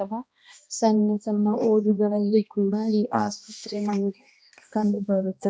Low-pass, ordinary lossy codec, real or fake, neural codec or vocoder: none; none; fake; codec, 16 kHz, 1 kbps, X-Codec, HuBERT features, trained on balanced general audio